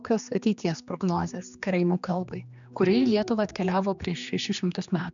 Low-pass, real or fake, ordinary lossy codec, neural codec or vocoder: 7.2 kHz; fake; MP3, 96 kbps; codec, 16 kHz, 2 kbps, X-Codec, HuBERT features, trained on general audio